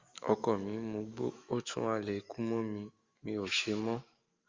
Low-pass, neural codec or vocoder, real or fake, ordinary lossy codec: 7.2 kHz; autoencoder, 48 kHz, 128 numbers a frame, DAC-VAE, trained on Japanese speech; fake; Opus, 32 kbps